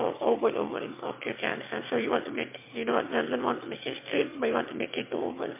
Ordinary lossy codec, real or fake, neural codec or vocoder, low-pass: MP3, 24 kbps; fake; autoencoder, 22.05 kHz, a latent of 192 numbers a frame, VITS, trained on one speaker; 3.6 kHz